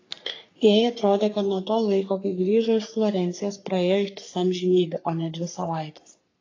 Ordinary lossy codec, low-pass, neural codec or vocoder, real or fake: AAC, 32 kbps; 7.2 kHz; codec, 44.1 kHz, 3.4 kbps, Pupu-Codec; fake